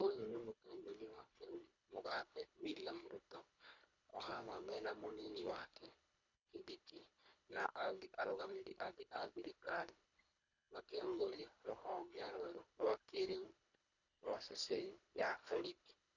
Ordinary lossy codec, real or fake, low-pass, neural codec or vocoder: none; fake; 7.2 kHz; codec, 24 kHz, 1.5 kbps, HILCodec